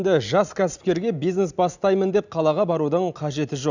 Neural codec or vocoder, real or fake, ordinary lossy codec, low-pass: none; real; none; 7.2 kHz